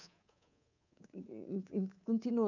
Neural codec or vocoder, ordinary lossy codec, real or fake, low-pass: codec, 24 kHz, 3.1 kbps, DualCodec; none; fake; 7.2 kHz